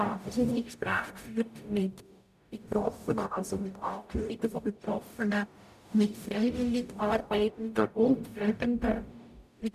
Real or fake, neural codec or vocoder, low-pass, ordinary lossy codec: fake; codec, 44.1 kHz, 0.9 kbps, DAC; 14.4 kHz; none